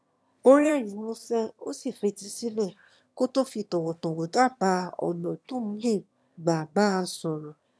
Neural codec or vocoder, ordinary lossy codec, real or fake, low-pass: autoencoder, 22.05 kHz, a latent of 192 numbers a frame, VITS, trained on one speaker; none; fake; none